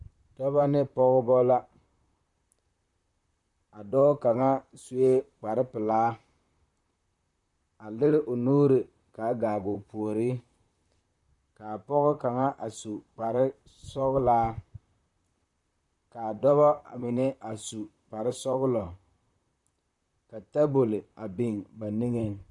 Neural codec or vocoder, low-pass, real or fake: vocoder, 44.1 kHz, 128 mel bands, Pupu-Vocoder; 10.8 kHz; fake